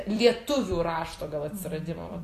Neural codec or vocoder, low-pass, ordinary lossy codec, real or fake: vocoder, 44.1 kHz, 128 mel bands every 256 samples, BigVGAN v2; 14.4 kHz; AAC, 48 kbps; fake